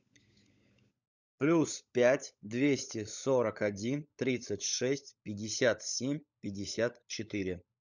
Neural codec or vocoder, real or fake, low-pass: codec, 16 kHz, 16 kbps, FunCodec, trained on LibriTTS, 50 frames a second; fake; 7.2 kHz